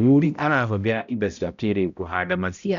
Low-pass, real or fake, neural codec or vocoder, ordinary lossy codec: 7.2 kHz; fake; codec, 16 kHz, 0.5 kbps, X-Codec, HuBERT features, trained on balanced general audio; none